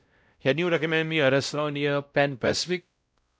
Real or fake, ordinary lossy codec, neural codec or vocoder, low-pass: fake; none; codec, 16 kHz, 0.5 kbps, X-Codec, WavLM features, trained on Multilingual LibriSpeech; none